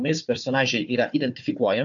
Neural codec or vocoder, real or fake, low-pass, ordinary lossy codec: codec, 16 kHz, 4 kbps, FunCodec, trained on Chinese and English, 50 frames a second; fake; 7.2 kHz; AAC, 64 kbps